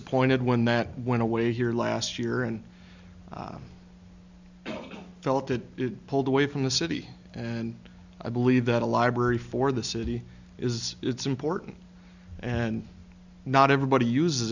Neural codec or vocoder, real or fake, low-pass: none; real; 7.2 kHz